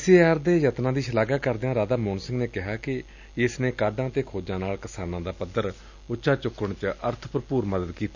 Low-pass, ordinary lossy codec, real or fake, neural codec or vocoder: 7.2 kHz; none; real; none